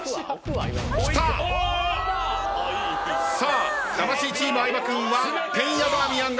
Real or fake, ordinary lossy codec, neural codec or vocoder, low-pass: real; none; none; none